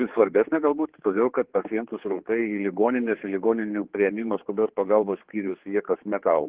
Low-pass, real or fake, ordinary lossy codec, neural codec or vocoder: 3.6 kHz; fake; Opus, 16 kbps; codec, 16 kHz, 4 kbps, X-Codec, HuBERT features, trained on general audio